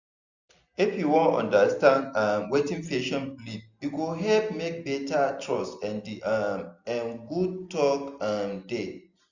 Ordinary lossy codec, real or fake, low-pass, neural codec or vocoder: none; real; 7.2 kHz; none